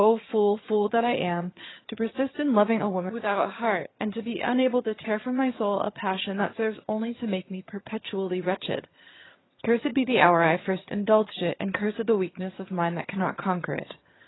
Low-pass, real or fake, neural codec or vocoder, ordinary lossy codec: 7.2 kHz; fake; vocoder, 22.05 kHz, 80 mel bands, HiFi-GAN; AAC, 16 kbps